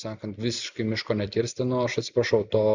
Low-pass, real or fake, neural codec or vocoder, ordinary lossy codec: 7.2 kHz; real; none; Opus, 64 kbps